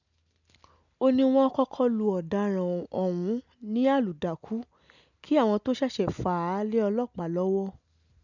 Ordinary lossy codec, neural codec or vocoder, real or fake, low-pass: none; none; real; 7.2 kHz